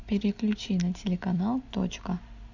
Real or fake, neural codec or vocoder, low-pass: real; none; 7.2 kHz